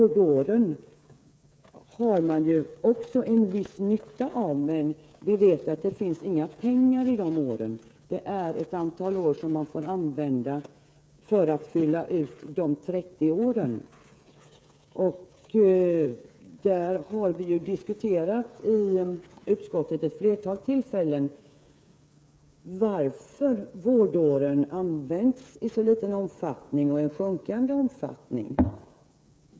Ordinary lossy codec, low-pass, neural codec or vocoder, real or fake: none; none; codec, 16 kHz, 8 kbps, FreqCodec, smaller model; fake